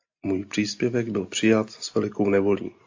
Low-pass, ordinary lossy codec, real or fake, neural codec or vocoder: 7.2 kHz; AAC, 48 kbps; real; none